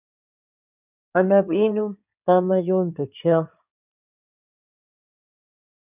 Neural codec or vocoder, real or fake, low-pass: codec, 16 kHz in and 24 kHz out, 2.2 kbps, FireRedTTS-2 codec; fake; 3.6 kHz